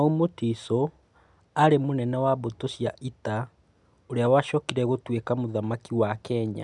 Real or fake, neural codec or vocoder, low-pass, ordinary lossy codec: real; none; 10.8 kHz; none